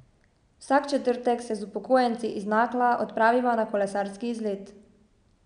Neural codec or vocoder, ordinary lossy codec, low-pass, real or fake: none; none; 9.9 kHz; real